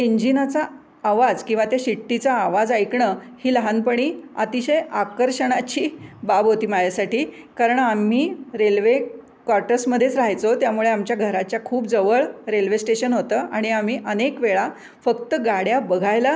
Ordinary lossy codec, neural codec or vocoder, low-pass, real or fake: none; none; none; real